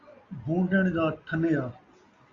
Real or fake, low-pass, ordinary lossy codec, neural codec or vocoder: real; 7.2 kHz; Opus, 64 kbps; none